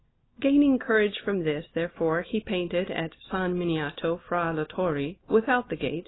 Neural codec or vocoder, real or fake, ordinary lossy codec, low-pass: none; real; AAC, 16 kbps; 7.2 kHz